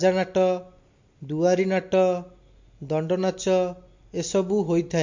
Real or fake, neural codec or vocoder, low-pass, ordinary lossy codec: real; none; 7.2 kHz; MP3, 48 kbps